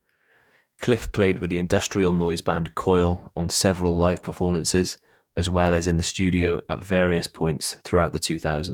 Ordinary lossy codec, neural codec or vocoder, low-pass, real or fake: none; codec, 44.1 kHz, 2.6 kbps, DAC; 19.8 kHz; fake